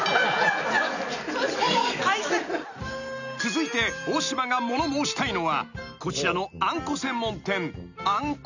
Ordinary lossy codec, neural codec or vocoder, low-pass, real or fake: none; none; 7.2 kHz; real